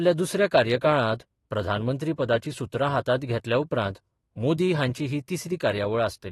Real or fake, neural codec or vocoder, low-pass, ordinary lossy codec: fake; autoencoder, 48 kHz, 128 numbers a frame, DAC-VAE, trained on Japanese speech; 19.8 kHz; AAC, 32 kbps